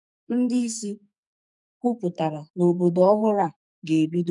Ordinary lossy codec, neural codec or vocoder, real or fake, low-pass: none; codec, 44.1 kHz, 2.6 kbps, SNAC; fake; 10.8 kHz